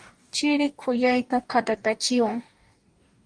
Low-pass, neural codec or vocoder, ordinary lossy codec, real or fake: 9.9 kHz; codec, 44.1 kHz, 2.6 kbps, DAC; Opus, 32 kbps; fake